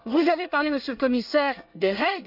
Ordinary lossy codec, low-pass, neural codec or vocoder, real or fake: none; 5.4 kHz; codec, 24 kHz, 1 kbps, SNAC; fake